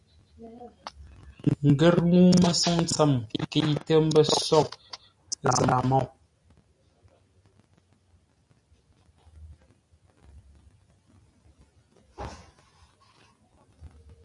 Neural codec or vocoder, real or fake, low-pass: none; real; 10.8 kHz